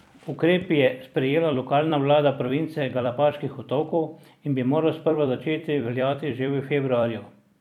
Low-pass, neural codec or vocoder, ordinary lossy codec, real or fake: 19.8 kHz; vocoder, 44.1 kHz, 128 mel bands every 256 samples, BigVGAN v2; none; fake